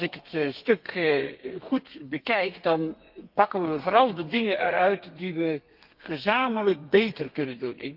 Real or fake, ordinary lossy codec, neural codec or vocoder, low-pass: fake; Opus, 24 kbps; codec, 32 kHz, 1.9 kbps, SNAC; 5.4 kHz